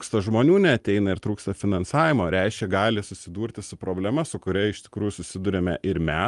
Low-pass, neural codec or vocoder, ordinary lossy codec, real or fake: 10.8 kHz; none; Opus, 32 kbps; real